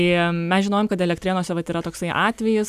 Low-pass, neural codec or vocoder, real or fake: 14.4 kHz; none; real